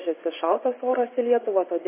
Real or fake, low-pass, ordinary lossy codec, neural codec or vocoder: fake; 3.6 kHz; MP3, 16 kbps; vocoder, 24 kHz, 100 mel bands, Vocos